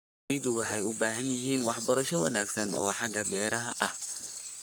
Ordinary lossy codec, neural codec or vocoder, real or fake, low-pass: none; codec, 44.1 kHz, 3.4 kbps, Pupu-Codec; fake; none